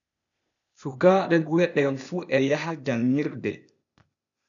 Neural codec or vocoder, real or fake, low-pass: codec, 16 kHz, 0.8 kbps, ZipCodec; fake; 7.2 kHz